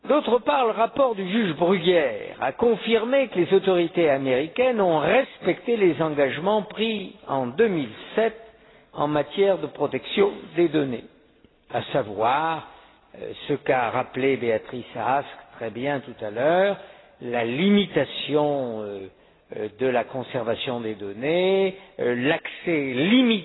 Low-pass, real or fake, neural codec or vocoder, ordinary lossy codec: 7.2 kHz; real; none; AAC, 16 kbps